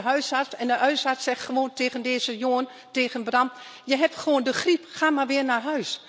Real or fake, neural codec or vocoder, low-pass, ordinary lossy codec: real; none; none; none